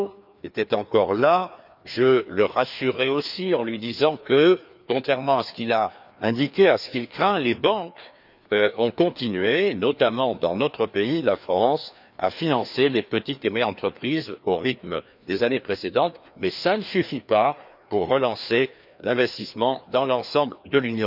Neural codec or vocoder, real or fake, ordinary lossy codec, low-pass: codec, 16 kHz, 2 kbps, FreqCodec, larger model; fake; none; 5.4 kHz